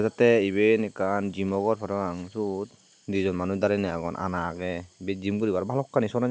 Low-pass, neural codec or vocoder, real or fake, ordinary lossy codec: none; none; real; none